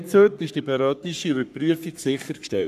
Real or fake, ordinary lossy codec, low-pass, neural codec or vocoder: fake; none; 14.4 kHz; codec, 44.1 kHz, 3.4 kbps, Pupu-Codec